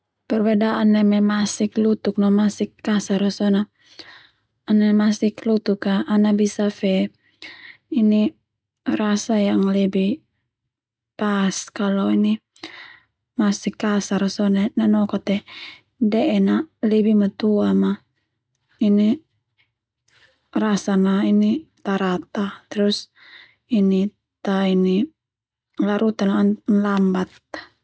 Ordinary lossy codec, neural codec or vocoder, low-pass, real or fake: none; none; none; real